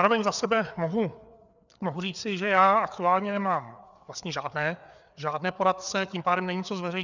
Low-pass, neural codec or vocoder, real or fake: 7.2 kHz; codec, 16 kHz, 4 kbps, FreqCodec, larger model; fake